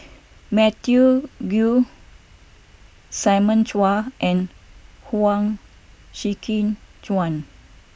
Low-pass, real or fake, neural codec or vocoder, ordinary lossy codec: none; real; none; none